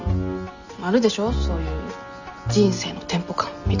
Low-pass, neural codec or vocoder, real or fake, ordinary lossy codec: 7.2 kHz; none; real; none